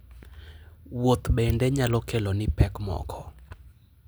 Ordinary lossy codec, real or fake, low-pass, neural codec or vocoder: none; real; none; none